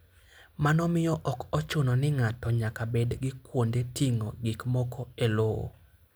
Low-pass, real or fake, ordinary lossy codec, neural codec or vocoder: none; real; none; none